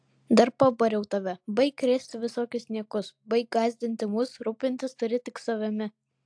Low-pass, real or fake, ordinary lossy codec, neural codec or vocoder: 9.9 kHz; real; AAC, 64 kbps; none